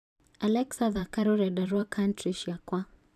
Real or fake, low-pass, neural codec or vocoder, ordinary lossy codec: fake; 14.4 kHz; vocoder, 44.1 kHz, 128 mel bands every 512 samples, BigVGAN v2; none